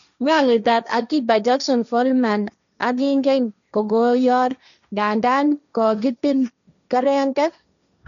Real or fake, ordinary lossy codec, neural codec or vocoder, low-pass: fake; none; codec, 16 kHz, 1.1 kbps, Voila-Tokenizer; 7.2 kHz